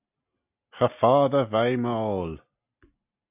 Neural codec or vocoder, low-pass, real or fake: none; 3.6 kHz; real